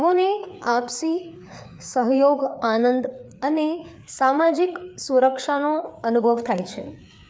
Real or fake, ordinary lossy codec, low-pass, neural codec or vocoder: fake; none; none; codec, 16 kHz, 4 kbps, FreqCodec, larger model